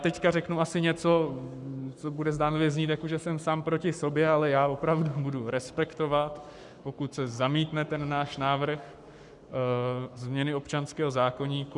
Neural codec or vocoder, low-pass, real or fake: codec, 44.1 kHz, 7.8 kbps, Pupu-Codec; 10.8 kHz; fake